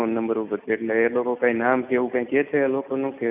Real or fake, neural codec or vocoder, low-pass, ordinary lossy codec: real; none; 3.6 kHz; none